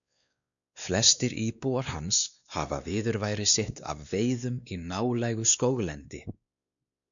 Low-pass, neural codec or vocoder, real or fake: 7.2 kHz; codec, 16 kHz, 2 kbps, X-Codec, WavLM features, trained on Multilingual LibriSpeech; fake